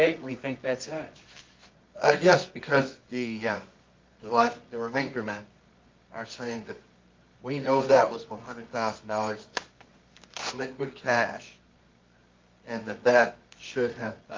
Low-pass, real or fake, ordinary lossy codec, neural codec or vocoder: 7.2 kHz; fake; Opus, 24 kbps; codec, 24 kHz, 0.9 kbps, WavTokenizer, medium music audio release